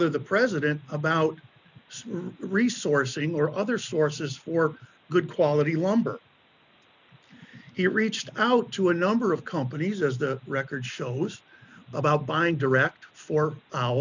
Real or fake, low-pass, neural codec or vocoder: real; 7.2 kHz; none